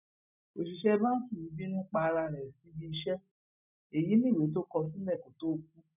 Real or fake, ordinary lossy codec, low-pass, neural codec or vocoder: real; none; 3.6 kHz; none